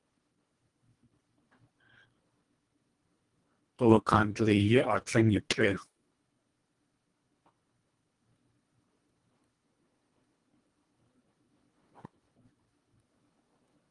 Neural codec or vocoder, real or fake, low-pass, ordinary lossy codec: codec, 24 kHz, 1.5 kbps, HILCodec; fake; 10.8 kHz; Opus, 24 kbps